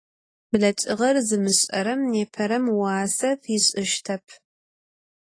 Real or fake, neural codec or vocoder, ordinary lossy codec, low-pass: real; none; AAC, 32 kbps; 9.9 kHz